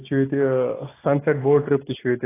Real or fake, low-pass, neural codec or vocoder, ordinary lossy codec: real; 3.6 kHz; none; AAC, 16 kbps